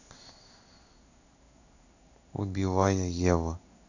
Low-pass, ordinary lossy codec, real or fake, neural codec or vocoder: 7.2 kHz; none; fake; codec, 16 kHz in and 24 kHz out, 1 kbps, XY-Tokenizer